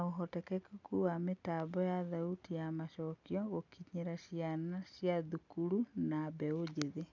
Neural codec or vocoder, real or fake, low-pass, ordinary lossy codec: none; real; 7.2 kHz; none